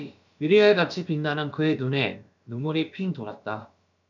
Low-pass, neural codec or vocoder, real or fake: 7.2 kHz; codec, 16 kHz, about 1 kbps, DyCAST, with the encoder's durations; fake